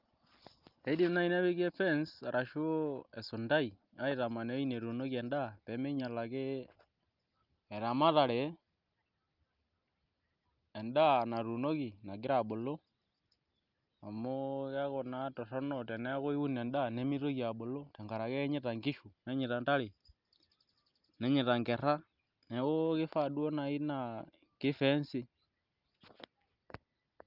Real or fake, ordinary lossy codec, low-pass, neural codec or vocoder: real; Opus, 24 kbps; 5.4 kHz; none